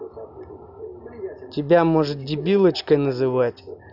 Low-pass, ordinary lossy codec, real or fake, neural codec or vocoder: 5.4 kHz; none; real; none